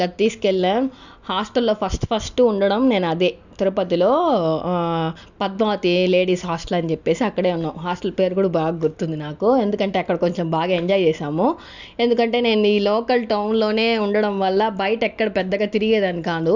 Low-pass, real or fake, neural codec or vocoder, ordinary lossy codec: 7.2 kHz; real; none; none